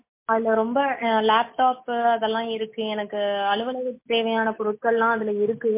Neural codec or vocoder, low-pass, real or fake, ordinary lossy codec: none; 3.6 kHz; real; MP3, 24 kbps